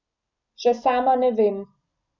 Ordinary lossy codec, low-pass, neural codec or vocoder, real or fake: none; 7.2 kHz; none; real